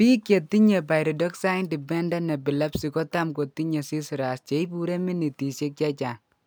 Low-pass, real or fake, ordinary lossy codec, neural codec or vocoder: none; real; none; none